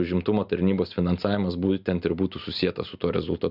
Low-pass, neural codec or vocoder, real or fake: 5.4 kHz; none; real